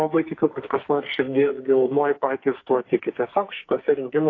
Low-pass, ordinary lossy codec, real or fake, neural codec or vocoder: 7.2 kHz; AAC, 32 kbps; fake; codec, 44.1 kHz, 2.6 kbps, SNAC